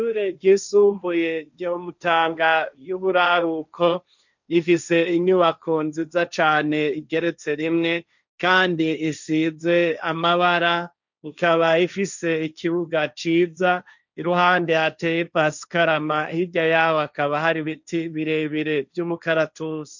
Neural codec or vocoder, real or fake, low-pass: codec, 16 kHz, 1.1 kbps, Voila-Tokenizer; fake; 7.2 kHz